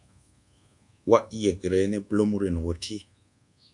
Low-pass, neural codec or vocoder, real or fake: 10.8 kHz; codec, 24 kHz, 1.2 kbps, DualCodec; fake